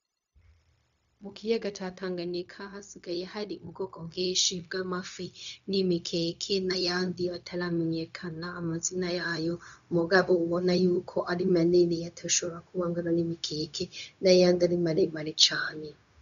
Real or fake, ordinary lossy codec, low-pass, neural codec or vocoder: fake; MP3, 96 kbps; 7.2 kHz; codec, 16 kHz, 0.4 kbps, LongCat-Audio-Codec